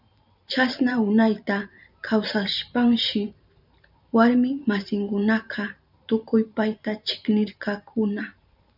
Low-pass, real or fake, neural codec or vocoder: 5.4 kHz; fake; vocoder, 44.1 kHz, 128 mel bands every 512 samples, BigVGAN v2